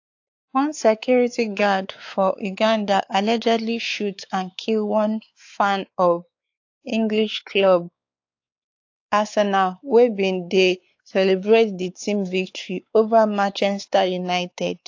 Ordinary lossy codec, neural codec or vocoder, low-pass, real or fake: AAC, 48 kbps; codec, 16 kHz, 4 kbps, X-Codec, WavLM features, trained on Multilingual LibriSpeech; 7.2 kHz; fake